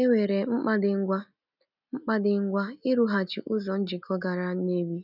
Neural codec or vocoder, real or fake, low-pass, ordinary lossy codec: none; real; 5.4 kHz; none